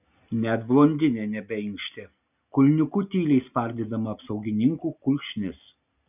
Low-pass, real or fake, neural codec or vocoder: 3.6 kHz; real; none